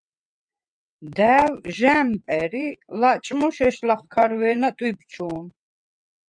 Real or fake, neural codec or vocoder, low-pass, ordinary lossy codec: fake; vocoder, 22.05 kHz, 80 mel bands, WaveNeXt; 9.9 kHz; MP3, 96 kbps